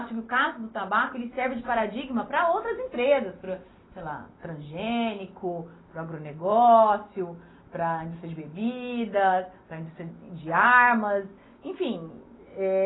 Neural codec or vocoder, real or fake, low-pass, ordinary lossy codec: none; real; 7.2 kHz; AAC, 16 kbps